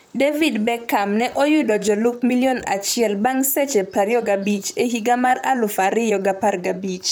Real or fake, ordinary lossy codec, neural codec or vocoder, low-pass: fake; none; vocoder, 44.1 kHz, 128 mel bands, Pupu-Vocoder; none